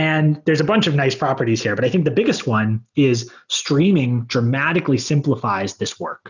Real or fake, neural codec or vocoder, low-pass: real; none; 7.2 kHz